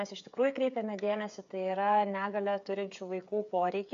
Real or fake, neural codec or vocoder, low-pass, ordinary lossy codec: fake; codec, 16 kHz, 8 kbps, FreqCodec, smaller model; 7.2 kHz; AAC, 64 kbps